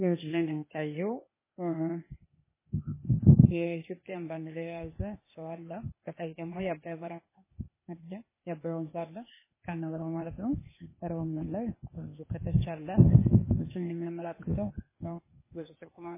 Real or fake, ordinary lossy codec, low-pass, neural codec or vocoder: fake; MP3, 16 kbps; 3.6 kHz; codec, 16 kHz, 0.8 kbps, ZipCodec